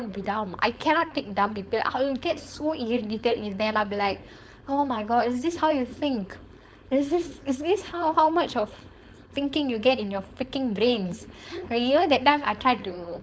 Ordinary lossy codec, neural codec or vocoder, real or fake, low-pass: none; codec, 16 kHz, 4.8 kbps, FACodec; fake; none